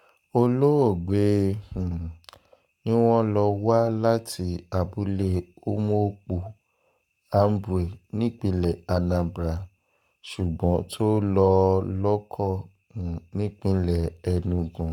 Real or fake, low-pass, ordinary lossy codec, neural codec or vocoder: fake; 19.8 kHz; none; codec, 44.1 kHz, 7.8 kbps, Pupu-Codec